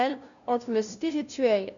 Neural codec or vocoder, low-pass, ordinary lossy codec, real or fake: codec, 16 kHz, 0.5 kbps, FunCodec, trained on LibriTTS, 25 frames a second; 7.2 kHz; none; fake